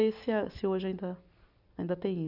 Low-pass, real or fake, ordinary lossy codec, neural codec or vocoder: 5.4 kHz; real; none; none